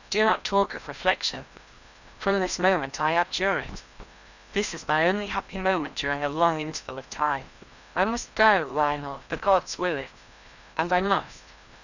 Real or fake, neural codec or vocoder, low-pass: fake; codec, 16 kHz, 1 kbps, FreqCodec, larger model; 7.2 kHz